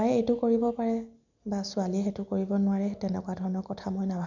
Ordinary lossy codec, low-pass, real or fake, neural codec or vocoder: none; 7.2 kHz; real; none